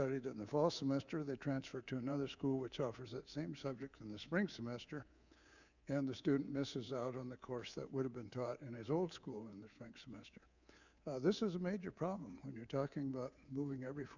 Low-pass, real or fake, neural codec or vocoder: 7.2 kHz; fake; codec, 24 kHz, 3.1 kbps, DualCodec